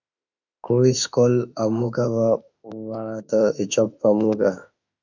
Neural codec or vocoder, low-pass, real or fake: autoencoder, 48 kHz, 32 numbers a frame, DAC-VAE, trained on Japanese speech; 7.2 kHz; fake